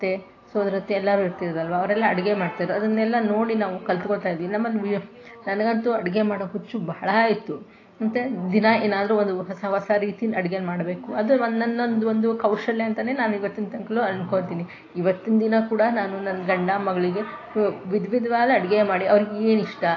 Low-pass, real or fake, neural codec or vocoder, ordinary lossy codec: 7.2 kHz; real; none; AAC, 32 kbps